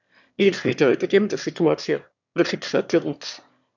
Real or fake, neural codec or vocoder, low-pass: fake; autoencoder, 22.05 kHz, a latent of 192 numbers a frame, VITS, trained on one speaker; 7.2 kHz